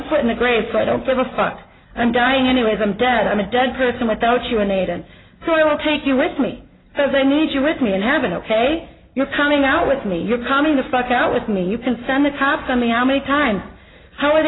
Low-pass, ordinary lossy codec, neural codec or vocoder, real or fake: 7.2 kHz; AAC, 16 kbps; none; real